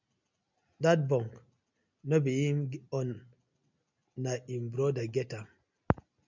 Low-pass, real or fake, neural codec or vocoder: 7.2 kHz; real; none